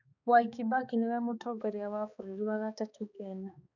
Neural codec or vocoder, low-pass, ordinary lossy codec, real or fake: codec, 16 kHz, 2 kbps, X-Codec, HuBERT features, trained on balanced general audio; 7.2 kHz; none; fake